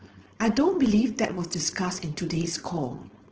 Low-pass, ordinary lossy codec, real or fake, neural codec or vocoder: 7.2 kHz; Opus, 16 kbps; fake; codec, 16 kHz, 4.8 kbps, FACodec